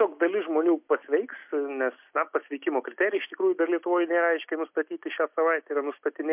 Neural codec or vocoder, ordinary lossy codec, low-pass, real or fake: none; MP3, 32 kbps; 3.6 kHz; real